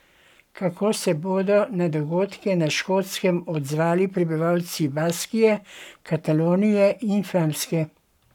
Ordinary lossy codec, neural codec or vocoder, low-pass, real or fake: none; codec, 44.1 kHz, 7.8 kbps, Pupu-Codec; 19.8 kHz; fake